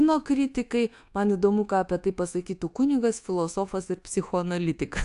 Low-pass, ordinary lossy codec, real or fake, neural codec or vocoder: 10.8 kHz; AAC, 64 kbps; fake; codec, 24 kHz, 1.2 kbps, DualCodec